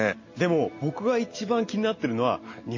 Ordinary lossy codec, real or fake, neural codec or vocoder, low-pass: MP3, 32 kbps; real; none; 7.2 kHz